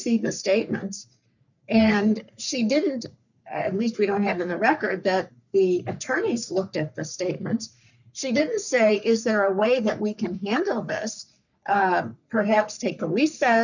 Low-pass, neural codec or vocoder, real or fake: 7.2 kHz; codec, 44.1 kHz, 3.4 kbps, Pupu-Codec; fake